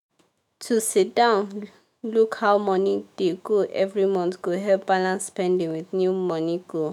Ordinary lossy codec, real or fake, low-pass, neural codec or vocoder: none; fake; 19.8 kHz; autoencoder, 48 kHz, 128 numbers a frame, DAC-VAE, trained on Japanese speech